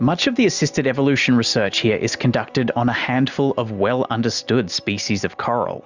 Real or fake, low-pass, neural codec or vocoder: real; 7.2 kHz; none